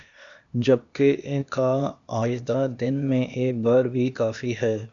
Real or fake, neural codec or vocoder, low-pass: fake; codec, 16 kHz, 0.8 kbps, ZipCodec; 7.2 kHz